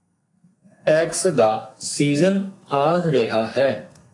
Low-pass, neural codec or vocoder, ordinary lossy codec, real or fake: 10.8 kHz; codec, 32 kHz, 1.9 kbps, SNAC; AAC, 48 kbps; fake